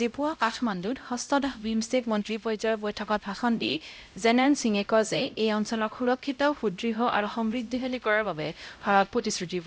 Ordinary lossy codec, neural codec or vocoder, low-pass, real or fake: none; codec, 16 kHz, 0.5 kbps, X-Codec, HuBERT features, trained on LibriSpeech; none; fake